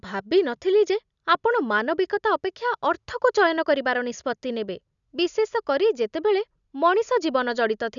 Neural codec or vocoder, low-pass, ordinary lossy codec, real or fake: none; 7.2 kHz; none; real